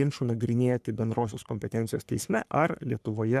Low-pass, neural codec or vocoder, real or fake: 14.4 kHz; codec, 44.1 kHz, 3.4 kbps, Pupu-Codec; fake